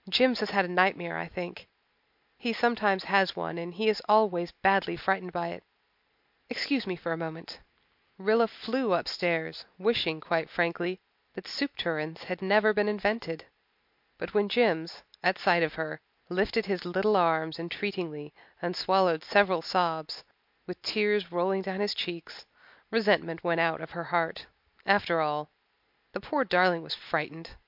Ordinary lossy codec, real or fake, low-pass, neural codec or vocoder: MP3, 48 kbps; real; 5.4 kHz; none